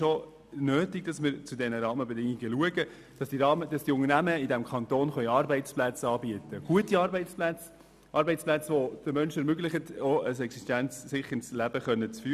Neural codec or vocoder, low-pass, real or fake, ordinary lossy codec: none; 14.4 kHz; real; none